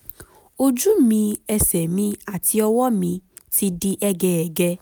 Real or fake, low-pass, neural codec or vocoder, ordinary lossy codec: real; none; none; none